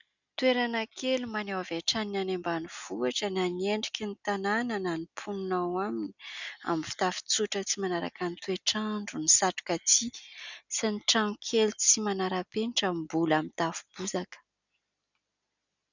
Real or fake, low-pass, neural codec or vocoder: real; 7.2 kHz; none